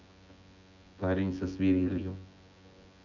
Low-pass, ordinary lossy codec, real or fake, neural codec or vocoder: 7.2 kHz; none; fake; vocoder, 24 kHz, 100 mel bands, Vocos